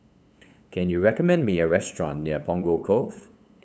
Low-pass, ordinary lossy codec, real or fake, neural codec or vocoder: none; none; fake; codec, 16 kHz, 8 kbps, FunCodec, trained on LibriTTS, 25 frames a second